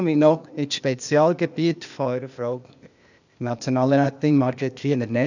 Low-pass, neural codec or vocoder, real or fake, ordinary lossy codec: 7.2 kHz; codec, 16 kHz, 0.8 kbps, ZipCodec; fake; none